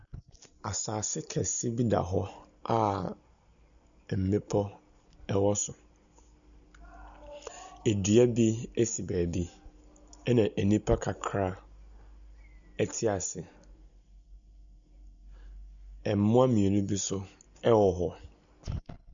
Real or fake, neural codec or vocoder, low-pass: real; none; 7.2 kHz